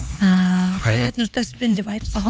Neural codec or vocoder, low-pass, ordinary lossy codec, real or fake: codec, 16 kHz, 4 kbps, X-Codec, HuBERT features, trained on LibriSpeech; none; none; fake